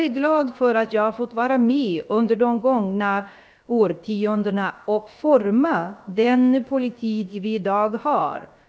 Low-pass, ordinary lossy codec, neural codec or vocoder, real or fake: none; none; codec, 16 kHz, about 1 kbps, DyCAST, with the encoder's durations; fake